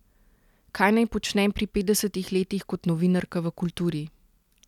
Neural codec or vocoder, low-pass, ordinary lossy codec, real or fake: none; 19.8 kHz; none; real